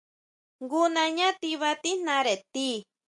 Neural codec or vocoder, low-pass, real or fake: none; 10.8 kHz; real